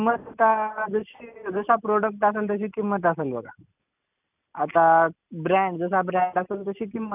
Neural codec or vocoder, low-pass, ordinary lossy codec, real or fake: none; 3.6 kHz; none; real